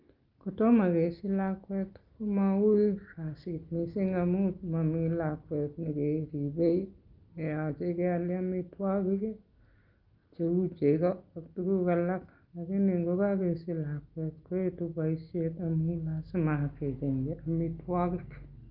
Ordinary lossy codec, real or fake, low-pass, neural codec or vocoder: Opus, 32 kbps; real; 5.4 kHz; none